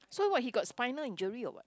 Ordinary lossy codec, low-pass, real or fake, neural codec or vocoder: none; none; real; none